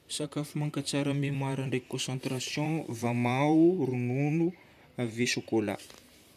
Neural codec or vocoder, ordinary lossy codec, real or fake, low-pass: vocoder, 44.1 kHz, 128 mel bands, Pupu-Vocoder; none; fake; 14.4 kHz